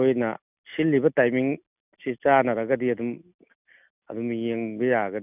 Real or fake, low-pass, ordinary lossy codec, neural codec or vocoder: real; 3.6 kHz; none; none